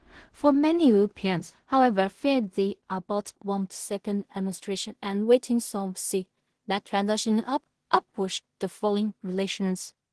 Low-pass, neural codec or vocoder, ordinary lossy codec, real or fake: 10.8 kHz; codec, 16 kHz in and 24 kHz out, 0.4 kbps, LongCat-Audio-Codec, two codebook decoder; Opus, 16 kbps; fake